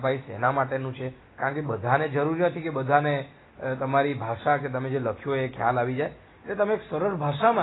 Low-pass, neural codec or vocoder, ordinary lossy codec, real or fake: 7.2 kHz; none; AAC, 16 kbps; real